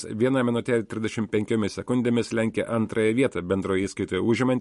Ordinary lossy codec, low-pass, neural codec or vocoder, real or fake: MP3, 48 kbps; 14.4 kHz; none; real